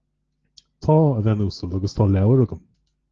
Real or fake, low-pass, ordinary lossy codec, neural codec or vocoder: real; 7.2 kHz; Opus, 16 kbps; none